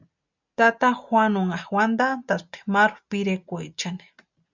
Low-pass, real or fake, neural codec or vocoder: 7.2 kHz; real; none